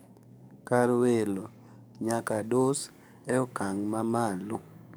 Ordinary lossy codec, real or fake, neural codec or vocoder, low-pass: none; fake; codec, 44.1 kHz, 7.8 kbps, DAC; none